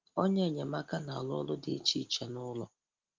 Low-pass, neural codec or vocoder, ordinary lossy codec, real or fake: 7.2 kHz; none; Opus, 32 kbps; real